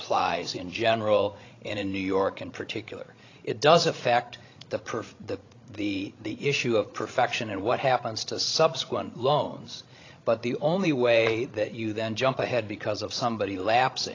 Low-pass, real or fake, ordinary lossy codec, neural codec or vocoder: 7.2 kHz; fake; AAC, 32 kbps; codec, 16 kHz, 16 kbps, FreqCodec, larger model